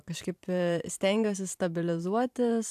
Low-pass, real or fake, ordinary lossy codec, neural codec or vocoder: 14.4 kHz; fake; AAC, 96 kbps; vocoder, 44.1 kHz, 128 mel bands every 512 samples, BigVGAN v2